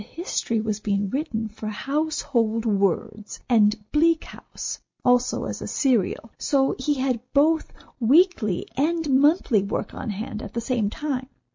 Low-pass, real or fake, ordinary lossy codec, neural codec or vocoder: 7.2 kHz; real; MP3, 48 kbps; none